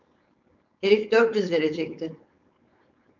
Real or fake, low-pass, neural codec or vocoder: fake; 7.2 kHz; codec, 16 kHz, 4.8 kbps, FACodec